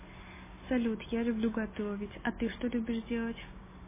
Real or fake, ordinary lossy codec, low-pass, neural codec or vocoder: real; MP3, 16 kbps; 3.6 kHz; none